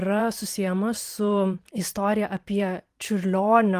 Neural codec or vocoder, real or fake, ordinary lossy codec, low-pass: vocoder, 44.1 kHz, 128 mel bands every 512 samples, BigVGAN v2; fake; Opus, 24 kbps; 14.4 kHz